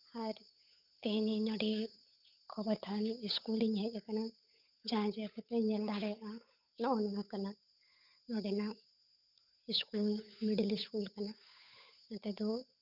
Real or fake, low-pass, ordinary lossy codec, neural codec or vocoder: fake; 5.4 kHz; none; codec, 16 kHz, 8 kbps, FunCodec, trained on Chinese and English, 25 frames a second